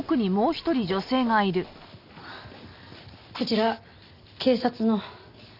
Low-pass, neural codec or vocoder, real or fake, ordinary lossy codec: 5.4 kHz; none; real; none